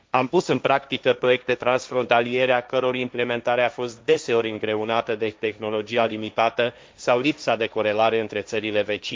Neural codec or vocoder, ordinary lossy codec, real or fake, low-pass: codec, 16 kHz, 1.1 kbps, Voila-Tokenizer; none; fake; none